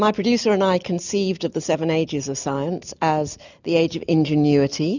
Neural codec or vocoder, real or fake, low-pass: none; real; 7.2 kHz